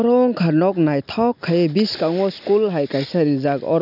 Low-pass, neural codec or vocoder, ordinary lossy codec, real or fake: 5.4 kHz; none; none; real